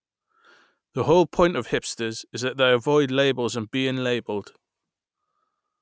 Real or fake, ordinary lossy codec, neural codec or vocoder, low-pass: real; none; none; none